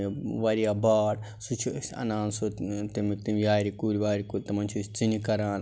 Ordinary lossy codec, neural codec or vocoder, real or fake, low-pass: none; none; real; none